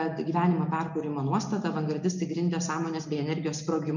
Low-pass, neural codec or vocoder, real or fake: 7.2 kHz; none; real